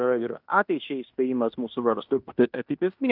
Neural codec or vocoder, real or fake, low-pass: codec, 16 kHz in and 24 kHz out, 0.9 kbps, LongCat-Audio-Codec, fine tuned four codebook decoder; fake; 5.4 kHz